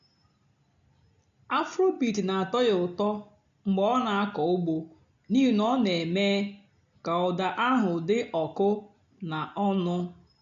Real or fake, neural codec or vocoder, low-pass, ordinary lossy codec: real; none; 7.2 kHz; AAC, 64 kbps